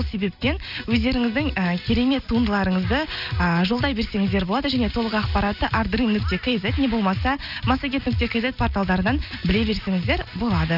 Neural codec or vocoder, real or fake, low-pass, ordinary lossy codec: none; real; 5.4 kHz; none